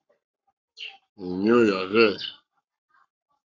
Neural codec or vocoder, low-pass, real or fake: codec, 44.1 kHz, 7.8 kbps, Pupu-Codec; 7.2 kHz; fake